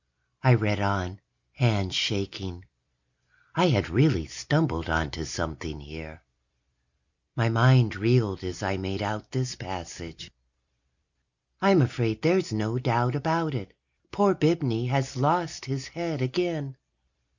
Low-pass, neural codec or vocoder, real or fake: 7.2 kHz; none; real